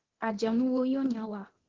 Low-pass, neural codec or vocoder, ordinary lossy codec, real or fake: 7.2 kHz; codec, 24 kHz, 0.9 kbps, DualCodec; Opus, 16 kbps; fake